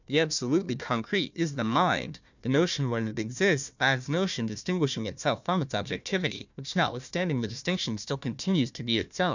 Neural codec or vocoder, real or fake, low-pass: codec, 16 kHz, 1 kbps, FunCodec, trained on Chinese and English, 50 frames a second; fake; 7.2 kHz